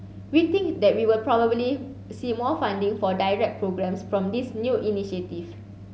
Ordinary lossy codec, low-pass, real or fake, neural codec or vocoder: none; none; real; none